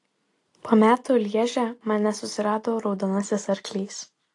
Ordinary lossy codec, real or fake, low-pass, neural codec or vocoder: AAC, 48 kbps; real; 10.8 kHz; none